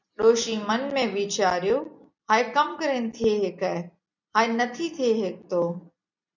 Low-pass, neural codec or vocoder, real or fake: 7.2 kHz; none; real